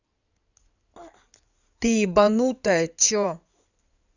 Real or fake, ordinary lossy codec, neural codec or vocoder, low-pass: fake; none; codec, 16 kHz in and 24 kHz out, 2.2 kbps, FireRedTTS-2 codec; 7.2 kHz